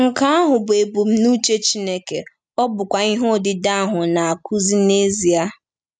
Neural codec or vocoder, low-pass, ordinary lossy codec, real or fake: none; 9.9 kHz; none; real